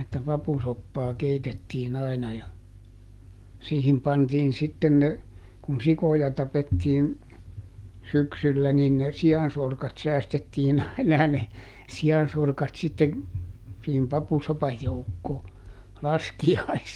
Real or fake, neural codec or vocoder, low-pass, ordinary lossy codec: fake; codec, 44.1 kHz, 7.8 kbps, DAC; 19.8 kHz; Opus, 24 kbps